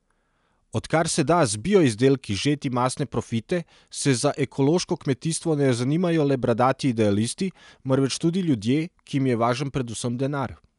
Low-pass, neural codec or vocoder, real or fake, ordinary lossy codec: 10.8 kHz; none; real; none